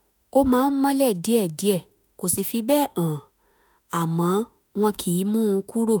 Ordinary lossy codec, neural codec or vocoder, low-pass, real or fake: none; autoencoder, 48 kHz, 32 numbers a frame, DAC-VAE, trained on Japanese speech; none; fake